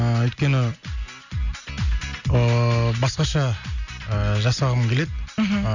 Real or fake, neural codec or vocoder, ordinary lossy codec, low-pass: real; none; none; 7.2 kHz